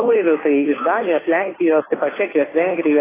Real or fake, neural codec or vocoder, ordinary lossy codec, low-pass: fake; codec, 16 kHz, 2 kbps, FunCodec, trained on Chinese and English, 25 frames a second; AAC, 16 kbps; 3.6 kHz